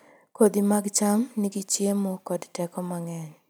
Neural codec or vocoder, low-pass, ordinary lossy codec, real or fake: none; none; none; real